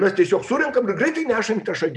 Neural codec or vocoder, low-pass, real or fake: none; 10.8 kHz; real